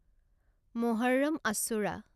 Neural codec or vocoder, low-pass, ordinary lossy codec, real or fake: none; 14.4 kHz; none; real